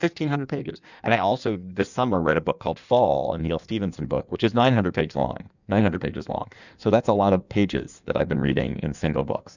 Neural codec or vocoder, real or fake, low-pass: codec, 16 kHz in and 24 kHz out, 1.1 kbps, FireRedTTS-2 codec; fake; 7.2 kHz